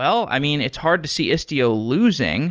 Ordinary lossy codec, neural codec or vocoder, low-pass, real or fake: Opus, 24 kbps; none; 7.2 kHz; real